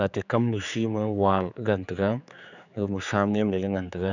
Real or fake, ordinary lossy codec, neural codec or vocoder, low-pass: fake; none; codec, 16 kHz, 4 kbps, X-Codec, HuBERT features, trained on general audio; 7.2 kHz